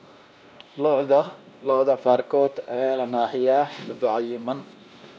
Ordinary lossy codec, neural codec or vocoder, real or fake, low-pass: none; codec, 16 kHz, 1 kbps, X-Codec, WavLM features, trained on Multilingual LibriSpeech; fake; none